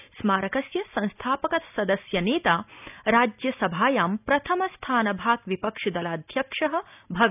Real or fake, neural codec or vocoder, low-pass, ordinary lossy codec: real; none; 3.6 kHz; none